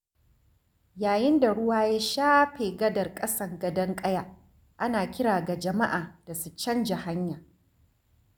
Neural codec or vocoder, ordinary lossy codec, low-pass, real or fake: none; none; none; real